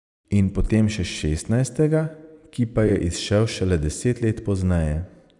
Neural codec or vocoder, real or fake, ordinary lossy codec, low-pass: vocoder, 44.1 kHz, 128 mel bands every 256 samples, BigVGAN v2; fake; none; 10.8 kHz